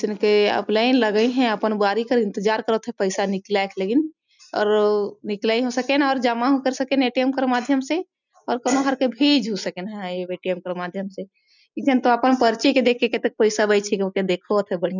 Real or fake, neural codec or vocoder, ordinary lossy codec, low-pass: real; none; none; 7.2 kHz